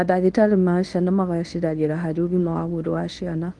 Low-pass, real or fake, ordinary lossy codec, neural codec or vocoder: 10.8 kHz; fake; Opus, 24 kbps; codec, 24 kHz, 0.9 kbps, WavTokenizer, medium speech release version 1